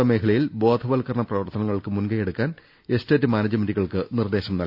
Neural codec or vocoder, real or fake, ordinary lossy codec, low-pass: none; real; none; 5.4 kHz